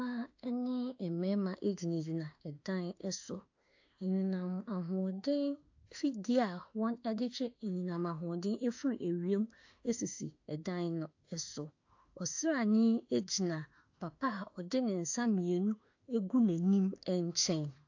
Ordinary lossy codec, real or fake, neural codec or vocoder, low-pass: AAC, 48 kbps; fake; autoencoder, 48 kHz, 32 numbers a frame, DAC-VAE, trained on Japanese speech; 7.2 kHz